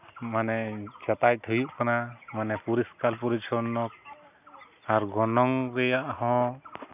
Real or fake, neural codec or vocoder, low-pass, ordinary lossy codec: real; none; 3.6 kHz; none